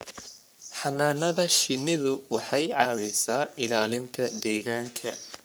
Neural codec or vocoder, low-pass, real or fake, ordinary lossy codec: codec, 44.1 kHz, 3.4 kbps, Pupu-Codec; none; fake; none